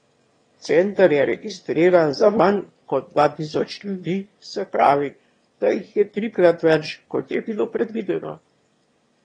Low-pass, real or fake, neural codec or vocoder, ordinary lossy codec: 9.9 kHz; fake; autoencoder, 22.05 kHz, a latent of 192 numbers a frame, VITS, trained on one speaker; AAC, 32 kbps